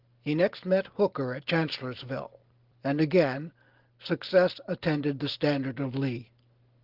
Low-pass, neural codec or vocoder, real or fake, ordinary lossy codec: 5.4 kHz; none; real; Opus, 16 kbps